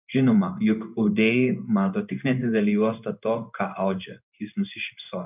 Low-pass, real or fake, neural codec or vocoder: 3.6 kHz; fake; codec, 16 kHz in and 24 kHz out, 1 kbps, XY-Tokenizer